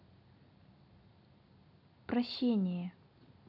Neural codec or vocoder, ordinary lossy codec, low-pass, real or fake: none; none; 5.4 kHz; real